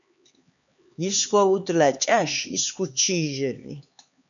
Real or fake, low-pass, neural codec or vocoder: fake; 7.2 kHz; codec, 16 kHz, 4 kbps, X-Codec, HuBERT features, trained on LibriSpeech